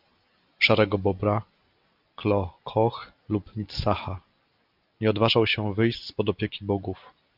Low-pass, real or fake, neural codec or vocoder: 5.4 kHz; real; none